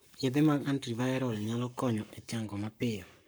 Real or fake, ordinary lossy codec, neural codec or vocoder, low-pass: fake; none; codec, 44.1 kHz, 7.8 kbps, Pupu-Codec; none